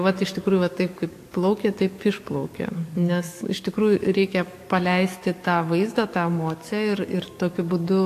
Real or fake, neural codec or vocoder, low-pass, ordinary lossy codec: fake; codec, 44.1 kHz, 7.8 kbps, DAC; 14.4 kHz; AAC, 64 kbps